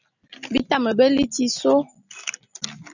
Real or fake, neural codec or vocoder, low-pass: real; none; 7.2 kHz